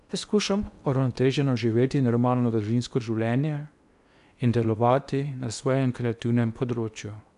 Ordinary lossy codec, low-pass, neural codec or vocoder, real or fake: none; 10.8 kHz; codec, 16 kHz in and 24 kHz out, 0.8 kbps, FocalCodec, streaming, 65536 codes; fake